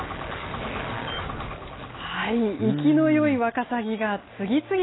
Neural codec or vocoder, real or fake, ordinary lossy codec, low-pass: none; real; AAC, 16 kbps; 7.2 kHz